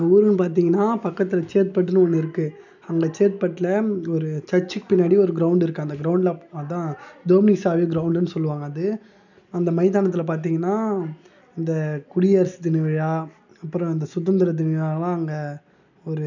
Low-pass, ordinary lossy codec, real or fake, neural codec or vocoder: 7.2 kHz; none; real; none